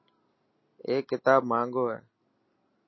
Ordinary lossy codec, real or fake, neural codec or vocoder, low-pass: MP3, 24 kbps; real; none; 7.2 kHz